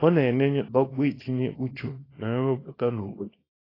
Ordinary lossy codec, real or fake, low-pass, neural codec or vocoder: AAC, 24 kbps; fake; 5.4 kHz; codec, 24 kHz, 0.9 kbps, WavTokenizer, small release